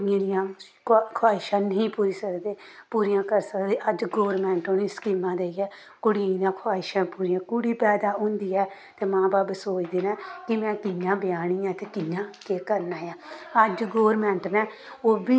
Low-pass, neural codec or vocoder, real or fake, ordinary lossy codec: none; none; real; none